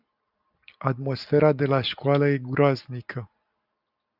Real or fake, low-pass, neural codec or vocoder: real; 5.4 kHz; none